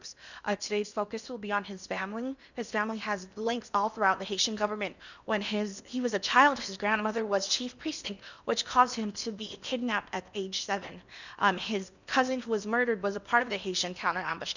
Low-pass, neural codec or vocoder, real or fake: 7.2 kHz; codec, 16 kHz in and 24 kHz out, 0.8 kbps, FocalCodec, streaming, 65536 codes; fake